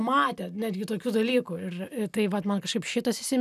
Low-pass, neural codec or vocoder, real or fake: 14.4 kHz; none; real